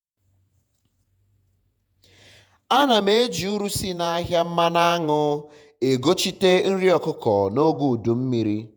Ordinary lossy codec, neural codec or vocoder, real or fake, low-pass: none; vocoder, 48 kHz, 128 mel bands, Vocos; fake; none